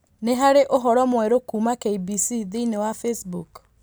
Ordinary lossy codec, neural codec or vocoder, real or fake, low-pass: none; none; real; none